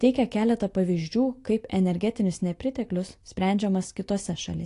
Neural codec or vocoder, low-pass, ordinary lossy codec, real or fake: none; 10.8 kHz; AAC, 48 kbps; real